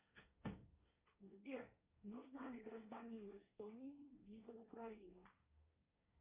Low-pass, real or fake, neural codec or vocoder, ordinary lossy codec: 3.6 kHz; fake; codec, 24 kHz, 1 kbps, SNAC; Opus, 64 kbps